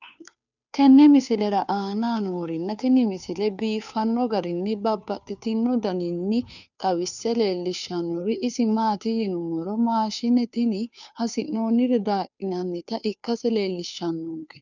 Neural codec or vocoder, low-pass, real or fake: codec, 16 kHz, 2 kbps, FunCodec, trained on Chinese and English, 25 frames a second; 7.2 kHz; fake